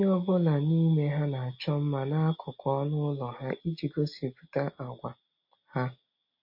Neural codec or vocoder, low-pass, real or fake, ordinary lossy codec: none; 5.4 kHz; real; MP3, 32 kbps